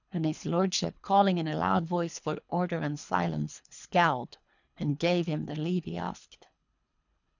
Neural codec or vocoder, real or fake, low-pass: codec, 24 kHz, 3 kbps, HILCodec; fake; 7.2 kHz